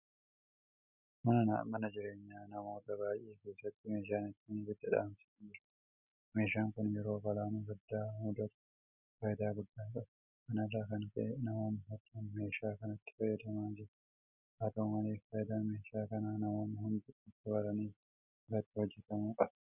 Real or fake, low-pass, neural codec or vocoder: real; 3.6 kHz; none